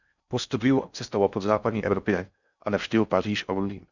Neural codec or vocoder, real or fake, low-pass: codec, 16 kHz in and 24 kHz out, 0.6 kbps, FocalCodec, streaming, 4096 codes; fake; 7.2 kHz